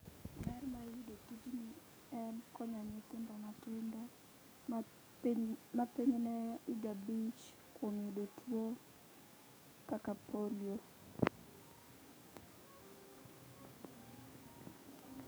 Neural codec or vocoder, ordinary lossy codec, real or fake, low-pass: codec, 44.1 kHz, 7.8 kbps, DAC; none; fake; none